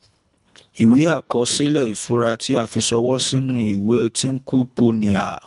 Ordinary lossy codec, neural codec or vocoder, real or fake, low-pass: none; codec, 24 kHz, 1.5 kbps, HILCodec; fake; 10.8 kHz